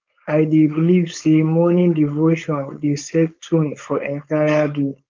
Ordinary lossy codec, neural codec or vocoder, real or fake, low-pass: Opus, 32 kbps; codec, 16 kHz, 4.8 kbps, FACodec; fake; 7.2 kHz